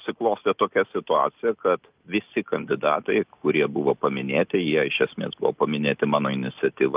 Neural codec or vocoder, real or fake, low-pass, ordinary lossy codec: none; real; 3.6 kHz; Opus, 24 kbps